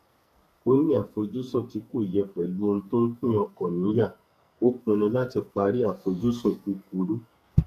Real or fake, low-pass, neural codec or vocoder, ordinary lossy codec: fake; 14.4 kHz; codec, 44.1 kHz, 2.6 kbps, SNAC; none